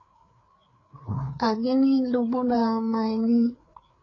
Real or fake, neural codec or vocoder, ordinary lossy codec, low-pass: fake; codec, 16 kHz, 4 kbps, FreqCodec, larger model; AAC, 32 kbps; 7.2 kHz